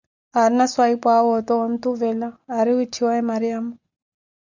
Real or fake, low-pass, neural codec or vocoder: real; 7.2 kHz; none